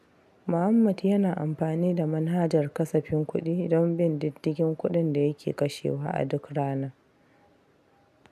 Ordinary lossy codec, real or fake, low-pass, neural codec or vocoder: AAC, 96 kbps; real; 14.4 kHz; none